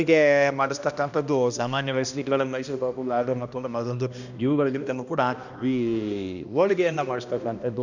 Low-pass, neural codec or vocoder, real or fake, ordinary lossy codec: 7.2 kHz; codec, 16 kHz, 1 kbps, X-Codec, HuBERT features, trained on balanced general audio; fake; none